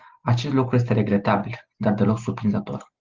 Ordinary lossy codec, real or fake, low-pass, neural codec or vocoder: Opus, 16 kbps; real; 7.2 kHz; none